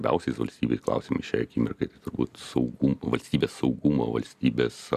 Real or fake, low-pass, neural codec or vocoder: fake; 14.4 kHz; vocoder, 44.1 kHz, 128 mel bands every 256 samples, BigVGAN v2